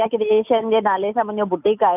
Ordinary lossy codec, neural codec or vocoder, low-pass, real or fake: none; none; 3.6 kHz; real